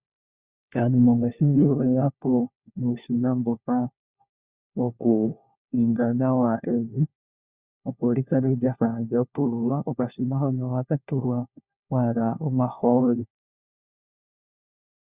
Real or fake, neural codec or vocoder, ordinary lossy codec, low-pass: fake; codec, 16 kHz, 1 kbps, FunCodec, trained on LibriTTS, 50 frames a second; Opus, 64 kbps; 3.6 kHz